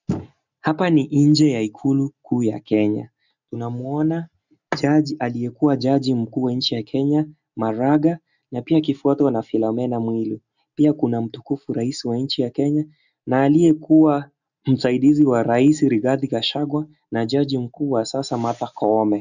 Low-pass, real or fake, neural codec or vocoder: 7.2 kHz; real; none